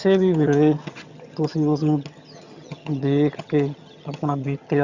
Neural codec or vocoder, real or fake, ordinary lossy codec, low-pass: vocoder, 22.05 kHz, 80 mel bands, HiFi-GAN; fake; Opus, 64 kbps; 7.2 kHz